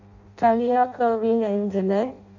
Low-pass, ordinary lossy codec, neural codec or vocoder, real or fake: 7.2 kHz; none; codec, 16 kHz in and 24 kHz out, 0.6 kbps, FireRedTTS-2 codec; fake